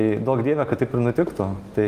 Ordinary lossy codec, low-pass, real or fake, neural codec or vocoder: Opus, 24 kbps; 14.4 kHz; real; none